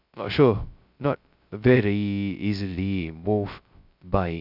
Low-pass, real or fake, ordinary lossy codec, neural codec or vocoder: 5.4 kHz; fake; none; codec, 16 kHz, 0.2 kbps, FocalCodec